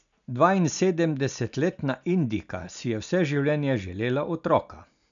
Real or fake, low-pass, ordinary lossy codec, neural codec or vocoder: real; 7.2 kHz; none; none